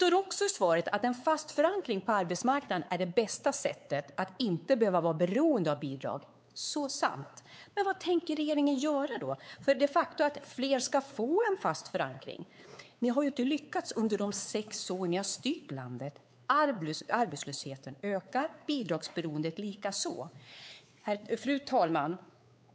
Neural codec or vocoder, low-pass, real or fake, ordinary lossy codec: codec, 16 kHz, 4 kbps, X-Codec, WavLM features, trained on Multilingual LibriSpeech; none; fake; none